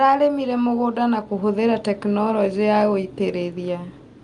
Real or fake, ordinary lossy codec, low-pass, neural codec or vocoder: real; Opus, 32 kbps; 10.8 kHz; none